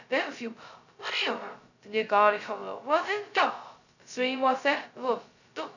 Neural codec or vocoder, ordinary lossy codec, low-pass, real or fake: codec, 16 kHz, 0.2 kbps, FocalCodec; none; 7.2 kHz; fake